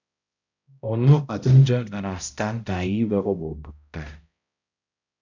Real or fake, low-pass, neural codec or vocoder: fake; 7.2 kHz; codec, 16 kHz, 0.5 kbps, X-Codec, HuBERT features, trained on balanced general audio